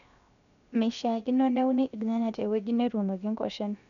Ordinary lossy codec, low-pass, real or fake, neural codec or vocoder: none; 7.2 kHz; fake; codec, 16 kHz, 0.7 kbps, FocalCodec